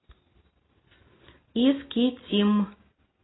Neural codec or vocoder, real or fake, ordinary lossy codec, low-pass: none; real; AAC, 16 kbps; 7.2 kHz